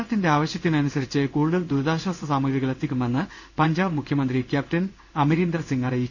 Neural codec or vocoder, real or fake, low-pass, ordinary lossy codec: codec, 16 kHz in and 24 kHz out, 1 kbps, XY-Tokenizer; fake; 7.2 kHz; none